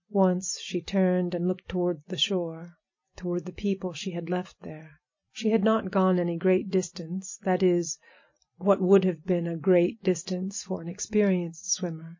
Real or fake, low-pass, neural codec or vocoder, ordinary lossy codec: real; 7.2 kHz; none; MP3, 32 kbps